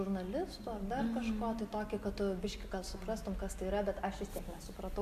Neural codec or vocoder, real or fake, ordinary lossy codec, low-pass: none; real; AAC, 96 kbps; 14.4 kHz